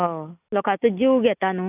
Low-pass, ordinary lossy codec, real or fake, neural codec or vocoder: 3.6 kHz; none; real; none